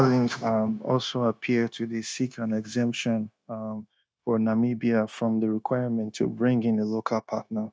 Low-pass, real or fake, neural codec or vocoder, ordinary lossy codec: none; fake; codec, 16 kHz, 0.9 kbps, LongCat-Audio-Codec; none